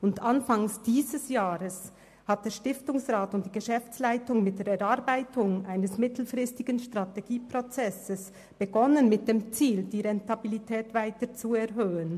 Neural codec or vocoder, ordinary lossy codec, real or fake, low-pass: none; MP3, 64 kbps; real; 14.4 kHz